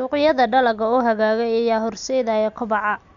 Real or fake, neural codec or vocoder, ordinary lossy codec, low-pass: real; none; none; 7.2 kHz